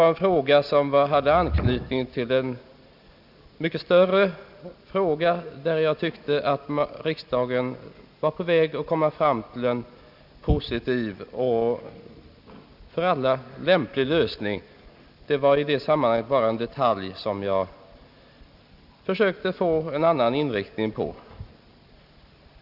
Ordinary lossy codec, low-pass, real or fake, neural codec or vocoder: none; 5.4 kHz; real; none